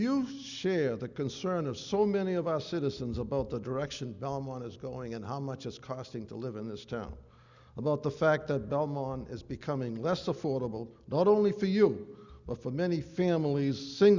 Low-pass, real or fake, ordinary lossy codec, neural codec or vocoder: 7.2 kHz; real; Opus, 64 kbps; none